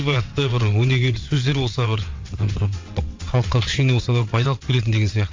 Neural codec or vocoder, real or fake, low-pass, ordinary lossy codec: vocoder, 22.05 kHz, 80 mel bands, WaveNeXt; fake; 7.2 kHz; MP3, 48 kbps